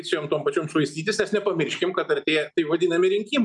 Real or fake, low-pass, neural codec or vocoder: real; 10.8 kHz; none